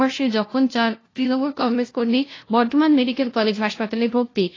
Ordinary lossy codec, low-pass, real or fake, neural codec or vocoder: AAC, 32 kbps; 7.2 kHz; fake; codec, 16 kHz, 0.5 kbps, FunCodec, trained on LibriTTS, 25 frames a second